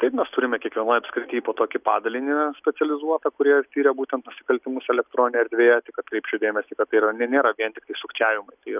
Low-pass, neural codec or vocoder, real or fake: 3.6 kHz; none; real